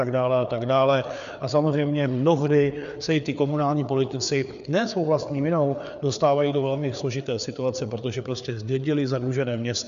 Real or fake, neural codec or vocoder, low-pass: fake; codec, 16 kHz, 4 kbps, FreqCodec, larger model; 7.2 kHz